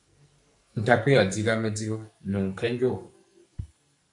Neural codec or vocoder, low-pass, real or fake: codec, 44.1 kHz, 2.6 kbps, SNAC; 10.8 kHz; fake